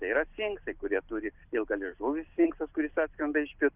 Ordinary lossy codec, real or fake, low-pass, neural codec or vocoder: Opus, 64 kbps; real; 3.6 kHz; none